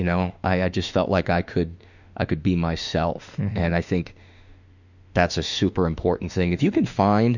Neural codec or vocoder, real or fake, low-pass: autoencoder, 48 kHz, 32 numbers a frame, DAC-VAE, trained on Japanese speech; fake; 7.2 kHz